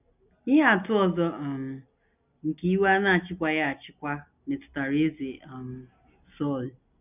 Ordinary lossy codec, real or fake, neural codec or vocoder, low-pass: none; real; none; 3.6 kHz